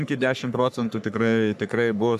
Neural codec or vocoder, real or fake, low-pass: codec, 44.1 kHz, 3.4 kbps, Pupu-Codec; fake; 14.4 kHz